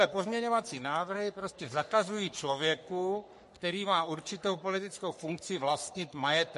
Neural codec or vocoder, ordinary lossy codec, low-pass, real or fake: codec, 44.1 kHz, 3.4 kbps, Pupu-Codec; MP3, 48 kbps; 14.4 kHz; fake